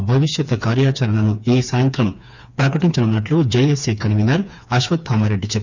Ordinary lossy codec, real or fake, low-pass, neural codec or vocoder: none; fake; 7.2 kHz; codec, 16 kHz, 4 kbps, FreqCodec, smaller model